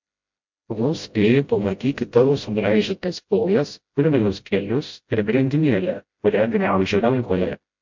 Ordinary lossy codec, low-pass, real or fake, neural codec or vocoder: MP3, 48 kbps; 7.2 kHz; fake; codec, 16 kHz, 0.5 kbps, FreqCodec, smaller model